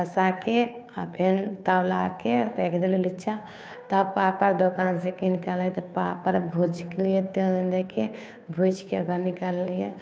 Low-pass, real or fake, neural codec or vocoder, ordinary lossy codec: none; fake; codec, 16 kHz, 2 kbps, FunCodec, trained on Chinese and English, 25 frames a second; none